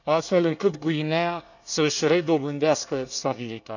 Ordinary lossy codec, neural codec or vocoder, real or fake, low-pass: none; codec, 24 kHz, 1 kbps, SNAC; fake; 7.2 kHz